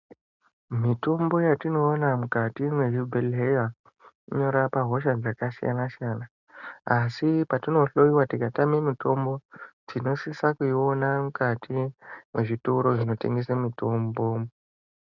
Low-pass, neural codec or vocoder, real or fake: 7.2 kHz; none; real